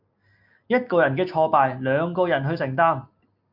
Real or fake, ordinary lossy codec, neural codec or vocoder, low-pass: real; MP3, 48 kbps; none; 5.4 kHz